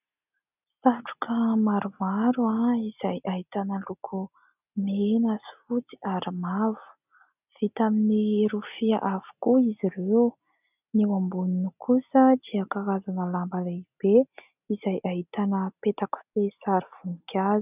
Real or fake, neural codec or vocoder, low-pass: real; none; 3.6 kHz